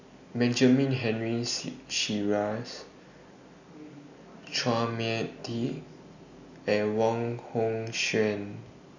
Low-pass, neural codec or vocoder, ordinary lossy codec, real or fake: 7.2 kHz; none; none; real